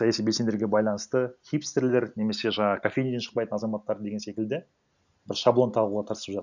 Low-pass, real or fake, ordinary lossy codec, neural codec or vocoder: 7.2 kHz; real; none; none